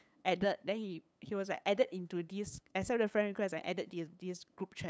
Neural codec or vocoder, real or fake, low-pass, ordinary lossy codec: codec, 16 kHz, 8 kbps, FunCodec, trained on LibriTTS, 25 frames a second; fake; none; none